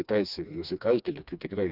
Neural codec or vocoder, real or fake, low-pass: codec, 16 kHz, 2 kbps, FreqCodec, smaller model; fake; 5.4 kHz